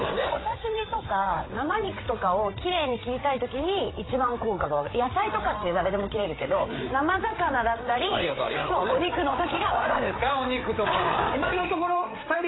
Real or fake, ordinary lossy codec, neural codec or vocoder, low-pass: fake; AAC, 16 kbps; codec, 16 kHz, 8 kbps, FreqCodec, larger model; 7.2 kHz